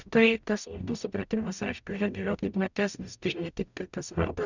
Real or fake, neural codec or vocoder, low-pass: fake; codec, 44.1 kHz, 0.9 kbps, DAC; 7.2 kHz